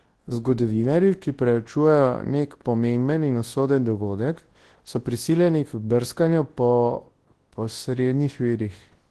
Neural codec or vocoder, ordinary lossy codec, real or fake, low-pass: codec, 24 kHz, 0.9 kbps, WavTokenizer, large speech release; Opus, 16 kbps; fake; 10.8 kHz